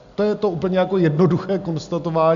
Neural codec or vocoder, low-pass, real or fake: none; 7.2 kHz; real